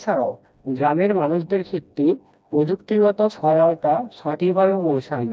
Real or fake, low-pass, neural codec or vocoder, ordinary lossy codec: fake; none; codec, 16 kHz, 1 kbps, FreqCodec, smaller model; none